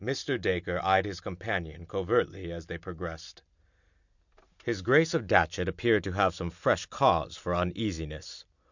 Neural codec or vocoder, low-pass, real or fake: none; 7.2 kHz; real